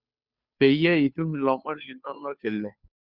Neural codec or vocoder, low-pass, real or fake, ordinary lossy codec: codec, 16 kHz, 2 kbps, FunCodec, trained on Chinese and English, 25 frames a second; 5.4 kHz; fake; Opus, 64 kbps